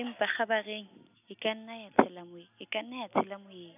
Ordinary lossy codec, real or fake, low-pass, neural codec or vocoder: none; real; 3.6 kHz; none